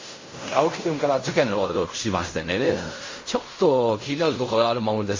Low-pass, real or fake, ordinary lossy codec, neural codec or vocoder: 7.2 kHz; fake; MP3, 32 kbps; codec, 16 kHz in and 24 kHz out, 0.4 kbps, LongCat-Audio-Codec, fine tuned four codebook decoder